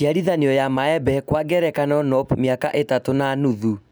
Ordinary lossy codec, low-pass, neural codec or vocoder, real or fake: none; none; none; real